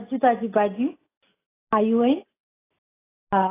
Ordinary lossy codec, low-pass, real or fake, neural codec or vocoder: AAC, 16 kbps; 3.6 kHz; real; none